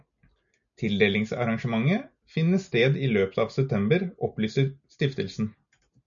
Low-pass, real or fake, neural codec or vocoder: 7.2 kHz; real; none